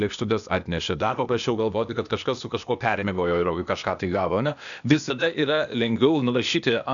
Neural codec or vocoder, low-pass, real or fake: codec, 16 kHz, 0.8 kbps, ZipCodec; 7.2 kHz; fake